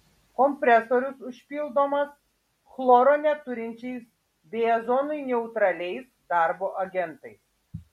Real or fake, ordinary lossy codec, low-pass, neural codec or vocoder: real; MP3, 64 kbps; 19.8 kHz; none